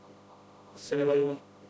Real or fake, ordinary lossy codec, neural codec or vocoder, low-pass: fake; none; codec, 16 kHz, 0.5 kbps, FreqCodec, smaller model; none